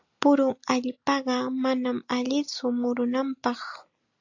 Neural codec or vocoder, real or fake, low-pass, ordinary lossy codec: none; real; 7.2 kHz; AAC, 48 kbps